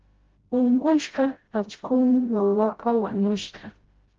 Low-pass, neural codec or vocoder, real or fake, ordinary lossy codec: 7.2 kHz; codec, 16 kHz, 0.5 kbps, FreqCodec, smaller model; fake; Opus, 16 kbps